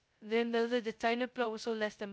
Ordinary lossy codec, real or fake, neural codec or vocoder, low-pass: none; fake; codec, 16 kHz, 0.2 kbps, FocalCodec; none